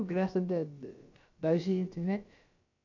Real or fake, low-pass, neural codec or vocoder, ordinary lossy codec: fake; 7.2 kHz; codec, 16 kHz, about 1 kbps, DyCAST, with the encoder's durations; AAC, 48 kbps